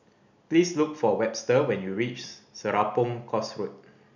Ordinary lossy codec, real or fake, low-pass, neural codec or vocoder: none; real; 7.2 kHz; none